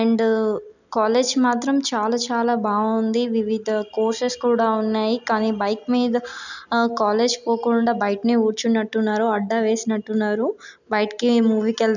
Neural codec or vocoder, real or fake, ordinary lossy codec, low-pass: none; real; none; 7.2 kHz